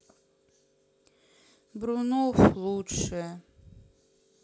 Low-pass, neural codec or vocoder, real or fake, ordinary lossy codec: none; none; real; none